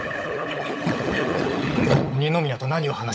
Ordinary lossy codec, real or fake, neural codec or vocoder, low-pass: none; fake; codec, 16 kHz, 16 kbps, FunCodec, trained on LibriTTS, 50 frames a second; none